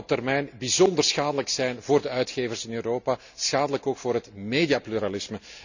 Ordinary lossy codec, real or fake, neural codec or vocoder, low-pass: none; real; none; 7.2 kHz